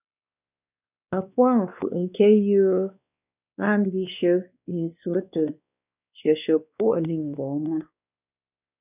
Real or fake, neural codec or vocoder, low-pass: fake; codec, 16 kHz, 2 kbps, X-Codec, WavLM features, trained on Multilingual LibriSpeech; 3.6 kHz